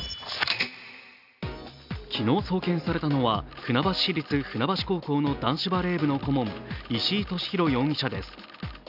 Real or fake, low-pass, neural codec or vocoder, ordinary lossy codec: real; 5.4 kHz; none; none